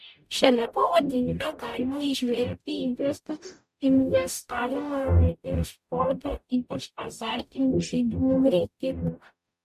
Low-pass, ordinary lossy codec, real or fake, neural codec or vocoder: 14.4 kHz; MP3, 64 kbps; fake; codec, 44.1 kHz, 0.9 kbps, DAC